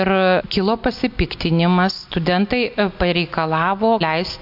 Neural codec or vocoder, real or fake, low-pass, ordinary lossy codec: none; real; 5.4 kHz; MP3, 48 kbps